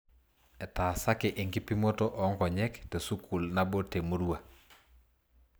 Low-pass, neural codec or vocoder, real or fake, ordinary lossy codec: none; none; real; none